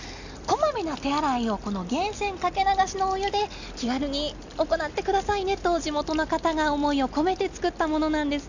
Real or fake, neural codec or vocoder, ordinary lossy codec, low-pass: real; none; none; 7.2 kHz